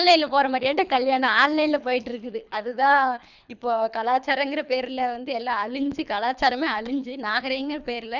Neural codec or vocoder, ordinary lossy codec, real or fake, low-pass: codec, 24 kHz, 3 kbps, HILCodec; none; fake; 7.2 kHz